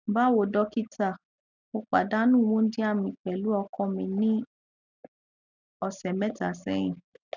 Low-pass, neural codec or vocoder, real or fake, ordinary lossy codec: 7.2 kHz; none; real; none